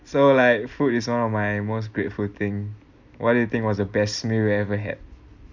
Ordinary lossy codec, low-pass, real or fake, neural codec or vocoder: none; 7.2 kHz; real; none